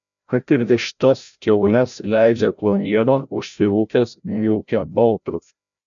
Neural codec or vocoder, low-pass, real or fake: codec, 16 kHz, 0.5 kbps, FreqCodec, larger model; 7.2 kHz; fake